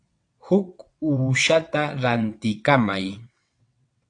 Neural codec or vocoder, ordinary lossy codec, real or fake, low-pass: vocoder, 22.05 kHz, 80 mel bands, WaveNeXt; AAC, 48 kbps; fake; 9.9 kHz